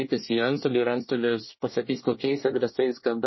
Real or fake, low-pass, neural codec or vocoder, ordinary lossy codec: fake; 7.2 kHz; codec, 24 kHz, 1 kbps, SNAC; MP3, 24 kbps